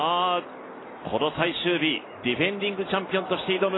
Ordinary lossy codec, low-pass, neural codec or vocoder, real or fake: AAC, 16 kbps; 7.2 kHz; none; real